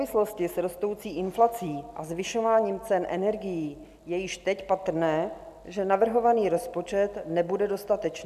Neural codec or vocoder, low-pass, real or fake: none; 14.4 kHz; real